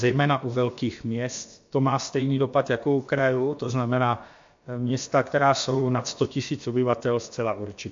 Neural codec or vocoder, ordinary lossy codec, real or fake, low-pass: codec, 16 kHz, about 1 kbps, DyCAST, with the encoder's durations; MP3, 48 kbps; fake; 7.2 kHz